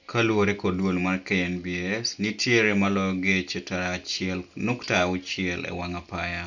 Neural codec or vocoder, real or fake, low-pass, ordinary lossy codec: none; real; 7.2 kHz; none